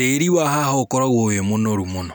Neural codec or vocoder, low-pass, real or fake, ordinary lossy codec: none; none; real; none